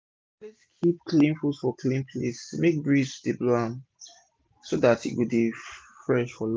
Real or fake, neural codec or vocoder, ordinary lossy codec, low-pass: real; none; none; none